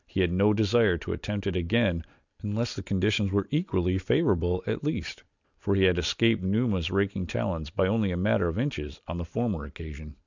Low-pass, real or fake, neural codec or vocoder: 7.2 kHz; real; none